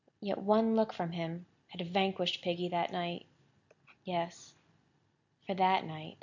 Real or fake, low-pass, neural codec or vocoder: real; 7.2 kHz; none